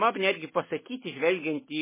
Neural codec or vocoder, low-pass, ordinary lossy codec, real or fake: none; 3.6 kHz; MP3, 16 kbps; real